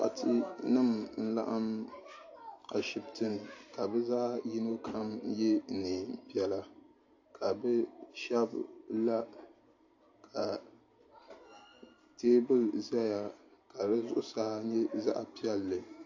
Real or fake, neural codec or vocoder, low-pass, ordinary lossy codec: real; none; 7.2 kHz; AAC, 48 kbps